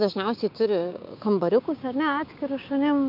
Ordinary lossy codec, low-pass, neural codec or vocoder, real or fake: MP3, 48 kbps; 5.4 kHz; codec, 16 kHz, 6 kbps, DAC; fake